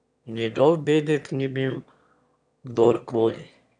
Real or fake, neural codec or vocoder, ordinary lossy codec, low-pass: fake; autoencoder, 22.05 kHz, a latent of 192 numbers a frame, VITS, trained on one speaker; none; 9.9 kHz